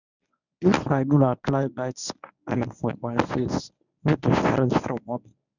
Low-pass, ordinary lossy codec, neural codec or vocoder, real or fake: 7.2 kHz; none; codec, 24 kHz, 0.9 kbps, WavTokenizer, medium speech release version 1; fake